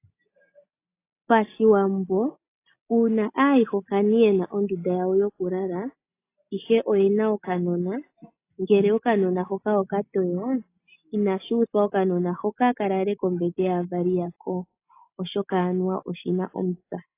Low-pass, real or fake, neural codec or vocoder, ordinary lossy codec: 3.6 kHz; real; none; AAC, 24 kbps